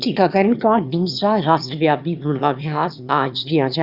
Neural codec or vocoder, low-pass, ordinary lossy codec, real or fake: autoencoder, 22.05 kHz, a latent of 192 numbers a frame, VITS, trained on one speaker; 5.4 kHz; Opus, 64 kbps; fake